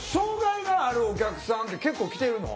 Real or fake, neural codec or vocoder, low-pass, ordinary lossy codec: real; none; none; none